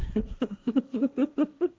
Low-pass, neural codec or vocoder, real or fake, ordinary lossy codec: none; codec, 16 kHz, 1.1 kbps, Voila-Tokenizer; fake; none